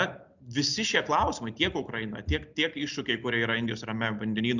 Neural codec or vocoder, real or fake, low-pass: none; real; 7.2 kHz